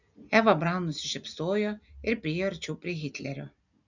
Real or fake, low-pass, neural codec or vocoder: real; 7.2 kHz; none